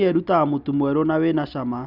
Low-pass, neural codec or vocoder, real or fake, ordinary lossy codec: 5.4 kHz; none; real; none